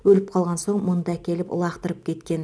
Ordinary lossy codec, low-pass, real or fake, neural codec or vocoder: none; none; real; none